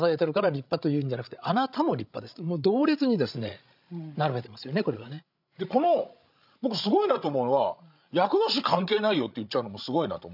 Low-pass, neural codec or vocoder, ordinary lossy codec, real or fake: 5.4 kHz; codec, 16 kHz, 16 kbps, FreqCodec, larger model; none; fake